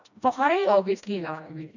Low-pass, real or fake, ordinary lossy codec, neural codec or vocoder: 7.2 kHz; fake; none; codec, 16 kHz, 1 kbps, FreqCodec, smaller model